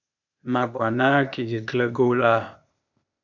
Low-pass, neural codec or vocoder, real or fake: 7.2 kHz; codec, 16 kHz, 0.8 kbps, ZipCodec; fake